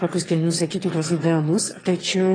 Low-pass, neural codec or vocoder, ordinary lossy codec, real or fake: 9.9 kHz; autoencoder, 22.05 kHz, a latent of 192 numbers a frame, VITS, trained on one speaker; AAC, 32 kbps; fake